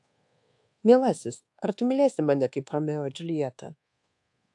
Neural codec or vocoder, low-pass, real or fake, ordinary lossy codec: codec, 24 kHz, 1.2 kbps, DualCodec; 10.8 kHz; fake; AAC, 64 kbps